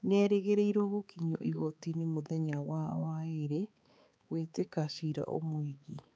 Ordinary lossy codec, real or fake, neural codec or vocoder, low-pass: none; fake; codec, 16 kHz, 4 kbps, X-Codec, HuBERT features, trained on balanced general audio; none